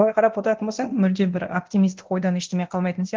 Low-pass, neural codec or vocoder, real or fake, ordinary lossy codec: 7.2 kHz; codec, 24 kHz, 0.9 kbps, DualCodec; fake; Opus, 16 kbps